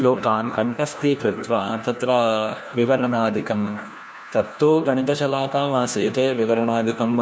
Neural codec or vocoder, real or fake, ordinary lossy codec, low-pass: codec, 16 kHz, 1 kbps, FunCodec, trained on LibriTTS, 50 frames a second; fake; none; none